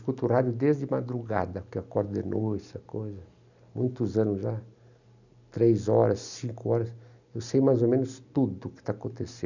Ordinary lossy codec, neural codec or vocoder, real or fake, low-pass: none; none; real; 7.2 kHz